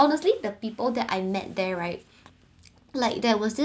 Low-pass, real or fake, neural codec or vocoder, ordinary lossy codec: none; real; none; none